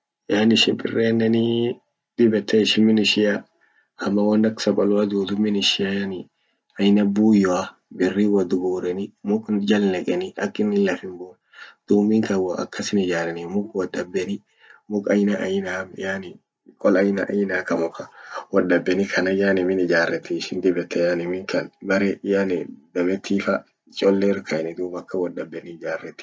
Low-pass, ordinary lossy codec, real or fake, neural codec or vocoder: none; none; real; none